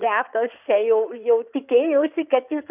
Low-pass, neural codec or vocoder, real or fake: 3.6 kHz; codec, 16 kHz in and 24 kHz out, 2.2 kbps, FireRedTTS-2 codec; fake